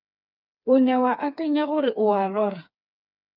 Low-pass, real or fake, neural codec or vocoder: 5.4 kHz; fake; codec, 16 kHz, 4 kbps, FreqCodec, smaller model